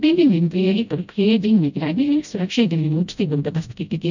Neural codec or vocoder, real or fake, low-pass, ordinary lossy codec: codec, 16 kHz, 0.5 kbps, FreqCodec, smaller model; fake; 7.2 kHz; none